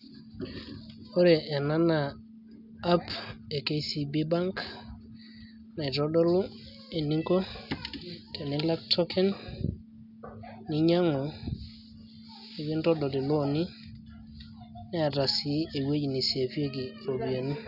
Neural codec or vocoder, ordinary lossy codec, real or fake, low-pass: none; none; real; 5.4 kHz